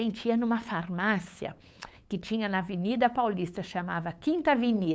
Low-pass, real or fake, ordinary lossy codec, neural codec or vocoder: none; fake; none; codec, 16 kHz, 8 kbps, FunCodec, trained on LibriTTS, 25 frames a second